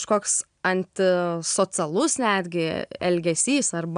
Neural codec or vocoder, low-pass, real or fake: none; 9.9 kHz; real